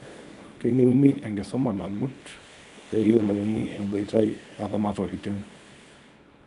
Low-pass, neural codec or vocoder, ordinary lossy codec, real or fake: 10.8 kHz; codec, 24 kHz, 0.9 kbps, WavTokenizer, small release; none; fake